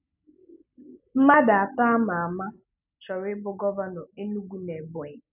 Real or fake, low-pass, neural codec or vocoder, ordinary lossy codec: real; 3.6 kHz; none; none